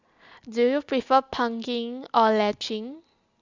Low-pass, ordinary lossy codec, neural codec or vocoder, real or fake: 7.2 kHz; Opus, 64 kbps; none; real